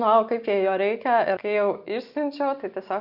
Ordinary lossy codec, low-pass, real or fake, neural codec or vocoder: Opus, 64 kbps; 5.4 kHz; real; none